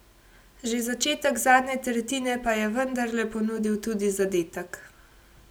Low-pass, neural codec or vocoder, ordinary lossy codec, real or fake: none; none; none; real